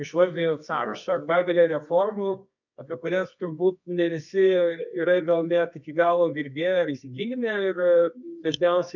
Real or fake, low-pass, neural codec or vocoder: fake; 7.2 kHz; codec, 24 kHz, 0.9 kbps, WavTokenizer, medium music audio release